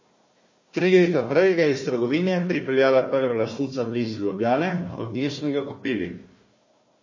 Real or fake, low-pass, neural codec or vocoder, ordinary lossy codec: fake; 7.2 kHz; codec, 16 kHz, 1 kbps, FunCodec, trained on Chinese and English, 50 frames a second; MP3, 32 kbps